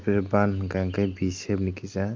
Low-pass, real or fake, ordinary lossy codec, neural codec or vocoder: 7.2 kHz; real; Opus, 24 kbps; none